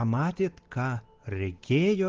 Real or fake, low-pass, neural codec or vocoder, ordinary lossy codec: real; 7.2 kHz; none; Opus, 16 kbps